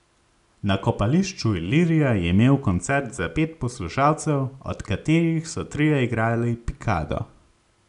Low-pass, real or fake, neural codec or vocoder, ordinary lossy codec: 10.8 kHz; real; none; none